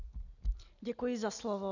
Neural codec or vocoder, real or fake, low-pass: none; real; 7.2 kHz